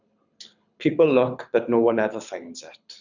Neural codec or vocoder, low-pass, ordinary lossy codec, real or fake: codec, 24 kHz, 6 kbps, HILCodec; 7.2 kHz; none; fake